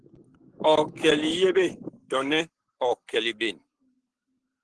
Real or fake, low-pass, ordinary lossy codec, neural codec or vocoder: fake; 10.8 kHz; Opus, 16 kbps; codec, 44.1 kHz, 7.8 kbps, Pupu-Codec